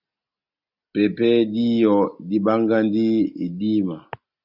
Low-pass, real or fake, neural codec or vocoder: 5.4 kHz; real; none